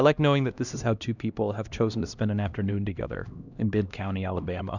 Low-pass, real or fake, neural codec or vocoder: 7.2 kHz; fake; codec, 16 kHz, 1 kbps, X-Codec, HuBERT features, trained on LibriSpeech